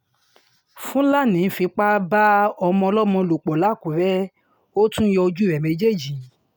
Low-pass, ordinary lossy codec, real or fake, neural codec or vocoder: none; none; real; none